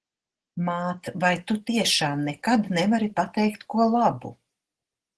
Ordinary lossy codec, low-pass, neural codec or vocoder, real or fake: Opus, 16 kbps; 10.8 kHz; none; real